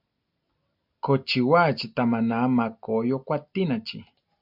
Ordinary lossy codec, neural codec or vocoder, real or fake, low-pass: MP3, 48 kbps; none; real; 5.4 kHz